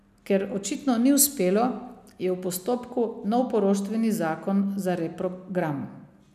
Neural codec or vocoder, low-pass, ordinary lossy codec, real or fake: none; 14.4 kHz; none; real